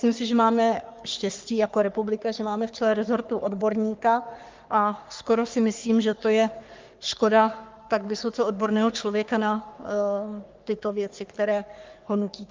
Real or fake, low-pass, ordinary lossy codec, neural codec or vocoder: fake; 7.2 kHz; Opus, 32 kbps; codec, 44.1 kHz, 3.4 kbps, Pupu-Codec